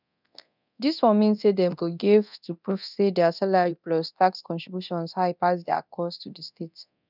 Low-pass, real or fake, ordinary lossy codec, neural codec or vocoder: 5.4 kHz; fake; none; codec, 24 kHz, 0.9 kbps, DualCodec